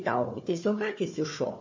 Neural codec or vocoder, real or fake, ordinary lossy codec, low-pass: codec, 16 kHz, 4 kbps, FunCodec, trained on Chinese and English, 50 frames a second; fake; MP3, 32 kbps; 7.2 kHz